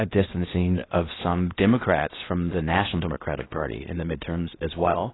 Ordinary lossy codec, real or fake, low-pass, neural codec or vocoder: AAC, 16 kbps; fake; 7.2 kHz; codec, 16 kHz in and 24 kHz out, 0.8 kbps, FocalCodec, streaming, 65536 codes